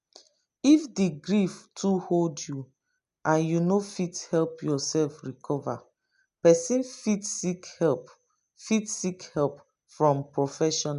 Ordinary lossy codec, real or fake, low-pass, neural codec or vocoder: MP3, 96 kbps; real; 10.8 kHz; none